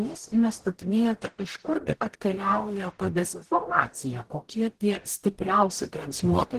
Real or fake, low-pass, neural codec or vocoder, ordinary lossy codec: fake; 14.4 kHz; codec, 44.1 kHz, 0.9 kbps, DAC; Opus, 16 kbps